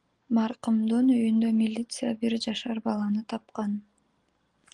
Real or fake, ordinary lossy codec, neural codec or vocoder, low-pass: real; Opus, 24 kbps; none; 10.8 kHz